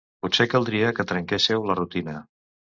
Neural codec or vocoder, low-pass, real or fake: none; 7.2 kHz; real